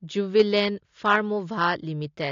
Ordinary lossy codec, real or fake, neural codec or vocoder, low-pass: AAC, 48 kbps; real; none; 7.2 kHz